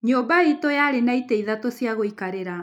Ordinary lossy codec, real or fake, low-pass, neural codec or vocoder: none; real; 19.8 kHz; none